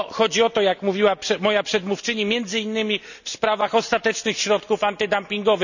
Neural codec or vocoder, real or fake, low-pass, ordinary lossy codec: none; real; 7.2 kHz; none